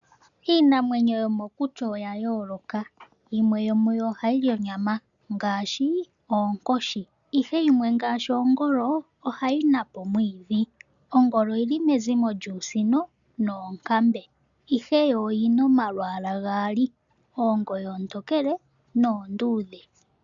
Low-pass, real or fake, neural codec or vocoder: 7.2 kHz; real; none